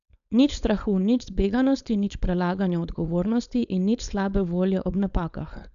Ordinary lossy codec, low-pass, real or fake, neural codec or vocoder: none; 7.2 kHz; fake; codec, 16 kHz, 4.8 kbps, FACodec